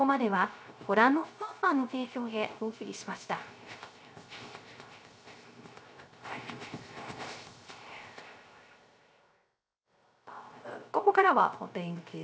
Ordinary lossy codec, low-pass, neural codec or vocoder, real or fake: none; none; codec, 16 kHz, 0.3 kbps, FocalCodec; fake